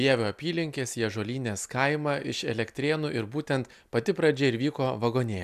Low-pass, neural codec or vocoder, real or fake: 14.4 kHz; none; real